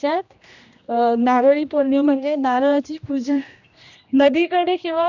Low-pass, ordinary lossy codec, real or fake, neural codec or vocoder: 7.2 kHz; none; fake; codec, 16 kHz, 1 kbps, X-Codec, HuBERT features, trained on general audio